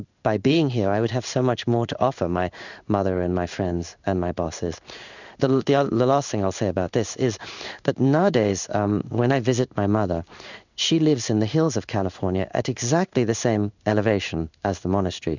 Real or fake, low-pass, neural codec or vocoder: fake; 7.2 kHz; codec, 16 kHz in and 24 kHz out, 1 kbps, XY-Tokenizer